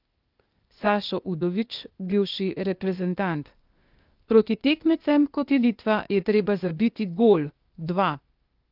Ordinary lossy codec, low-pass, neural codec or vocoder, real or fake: Opus, 24 kbps; 5.4 kHz; codec, 16 kHz, 0.8 kbps, ZipCodec; fake